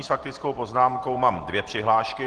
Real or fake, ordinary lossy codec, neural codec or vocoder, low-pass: real; Opus, 16 kbps; none; 10.8 kHz